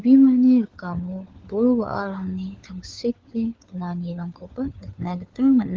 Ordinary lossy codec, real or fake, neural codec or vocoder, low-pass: Opus, 16 kbps; fake; codec, 16 kHz, 4 kbps, FunCodec, trained on LibriTTS, 50 frames a second; 7.2 kHz